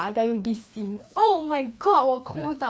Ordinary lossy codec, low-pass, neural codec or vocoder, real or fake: none; none; codec, 16 kHz, 2 kbps, FreqCodec, larger model; fake